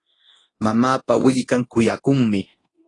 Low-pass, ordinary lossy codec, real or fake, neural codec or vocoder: 10.8 kHz; AAC, 32 kbps; fake; codec, 24 kHz, 0.9 kbps, DualCodec